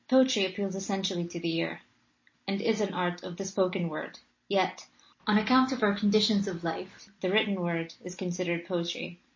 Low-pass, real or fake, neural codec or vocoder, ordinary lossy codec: 7.2 kHz; real; none; MP3, 32 kbps